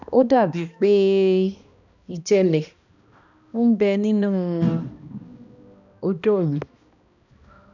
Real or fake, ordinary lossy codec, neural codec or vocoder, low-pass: fake; none; codec, 16 kHz, 1 kbps, X-Codec, HuBERT features, trained on balanced general audio; 7.2 kHz